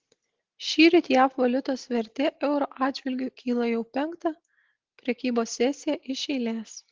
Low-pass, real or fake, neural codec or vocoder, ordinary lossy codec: 7.2 kHz; real; none; Opus, 16 kbps